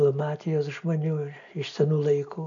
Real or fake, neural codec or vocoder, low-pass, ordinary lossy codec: real; none; 7.2 kHz; AAC, 48 kbps